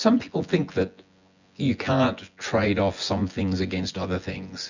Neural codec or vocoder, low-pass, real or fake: vocoder, 24 kHz, 100 mel bands, Vocos; 7.2 kHz; fake